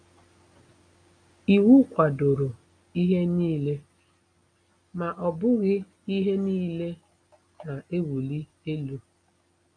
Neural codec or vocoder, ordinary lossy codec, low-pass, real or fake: none; none; 9.9 kHz; real